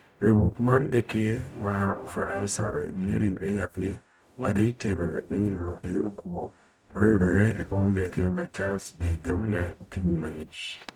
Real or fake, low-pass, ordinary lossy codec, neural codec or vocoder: fake; 19.8 kHz; none; codec, 44.1 kHz, 0.9 kbps, DAC